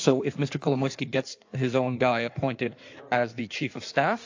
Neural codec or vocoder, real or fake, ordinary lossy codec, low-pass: codec, 16 kHz in and 24 kHz out, 1.1 kbps, FireRedTTS-2 codec; fake; AAC, 48 kbps; 7.2 kHz